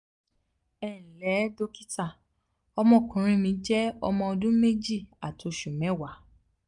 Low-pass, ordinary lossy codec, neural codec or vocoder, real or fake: 10.8 kHz; none; none; real